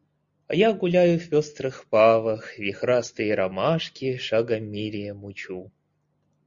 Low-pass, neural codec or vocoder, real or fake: 7.2 kHz; none; real